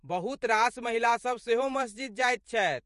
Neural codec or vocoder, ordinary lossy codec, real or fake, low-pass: vocoder, 48 kHz, 128 mel bands, Vocos; MP3, 48 kbps; fake; 14.4 kHz